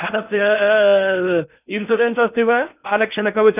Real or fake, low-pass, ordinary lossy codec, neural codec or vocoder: fake; 3.6 kHz; none; codec, 16 kHz in and 24 kHz out, 0.6 kbps, FocalCodec, streaming, 2048 codes